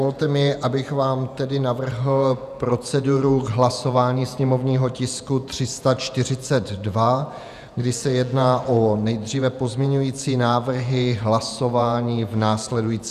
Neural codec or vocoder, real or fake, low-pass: vocoder, 48 kHz, 128 mel bands, Vocos; fake; 14.4 kHz